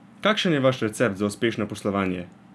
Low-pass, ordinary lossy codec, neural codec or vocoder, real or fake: none; none; none; real